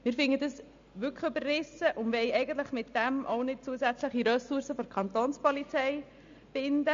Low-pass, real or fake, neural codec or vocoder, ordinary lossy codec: 7.2 kHz; real; none; MP3, 48 kbps